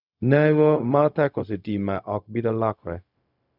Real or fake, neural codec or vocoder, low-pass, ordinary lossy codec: fake; codec, 16 kHz, 0.4 kbps, LongCat-Audio-Codec; 5.4 kHz; none